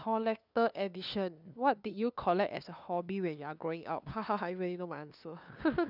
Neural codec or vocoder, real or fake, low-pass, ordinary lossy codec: codec, 16 kHz, 2 kbps, FunCodec, trained on LibriTTS, 25 frames a second; fake; 5.4 kHz; none